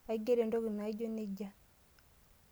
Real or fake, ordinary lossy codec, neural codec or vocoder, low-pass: real; none; none; none